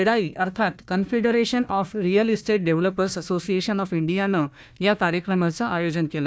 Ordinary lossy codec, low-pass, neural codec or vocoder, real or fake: none; none; codec, 16 kHz, 1 kbps, FunCodec, trained on Chinese and English, 50 frames a second; fake